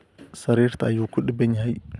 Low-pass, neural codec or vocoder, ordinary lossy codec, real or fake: none; none; none; real